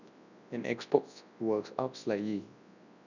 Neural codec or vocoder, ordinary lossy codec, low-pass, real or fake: codec, 24 kHz, 0.9 kbps, WavTokenizer, large speech release; none; 7.2 kHz; fake